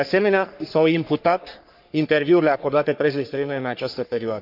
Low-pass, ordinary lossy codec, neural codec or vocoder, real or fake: 5.4 kHz; none; codec, 44.1 kHz, 3.4 kbps, Pupu-Codec; fake